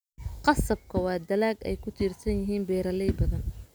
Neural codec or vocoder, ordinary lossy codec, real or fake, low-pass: none; none; real; none